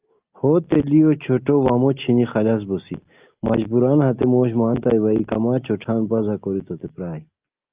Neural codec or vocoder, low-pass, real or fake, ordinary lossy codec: none; 3.6 kHz; real; Opus, 24 kbps